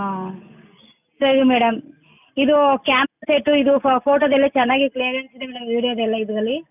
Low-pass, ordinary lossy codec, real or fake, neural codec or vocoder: 3.6 kHz; none; real; none